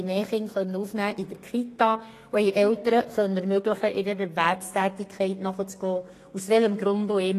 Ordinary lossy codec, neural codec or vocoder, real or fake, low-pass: AAC, 48 kbps; codec, 32 kHz, 1.9 kbps, SNAC; fake; 14.4 kHz